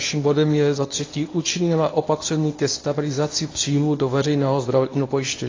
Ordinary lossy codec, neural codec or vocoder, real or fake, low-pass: AAC, 32 kbps; codec, 24 kHz, 0.9 kbps, WavTokenizer, medium speech release version 1; fake; 7.2 kHz